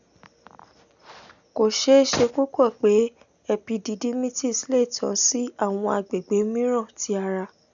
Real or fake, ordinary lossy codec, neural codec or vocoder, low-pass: real; none; none; 7.2 kHz